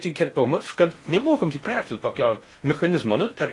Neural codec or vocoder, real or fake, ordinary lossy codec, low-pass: codec, 16 kHz in and 24 kHz out, 0.8 kbps, FocalCodec, streaming, 65536 codes; fake; AAC, 48 kbps; 10.8 kHz